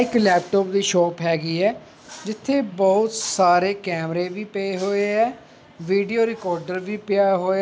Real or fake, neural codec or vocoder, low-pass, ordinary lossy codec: real; none; none; none